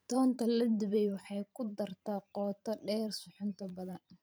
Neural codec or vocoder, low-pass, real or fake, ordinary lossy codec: vocoder, 44.1 kHz, 128 mel bands every 512 samples, BigVGAN v2; none; fake; none